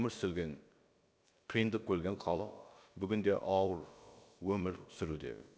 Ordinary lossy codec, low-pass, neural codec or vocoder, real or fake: none; none; codec, 16 kHz, about 1 kbps, DyCAST, with the encoder's durations; fake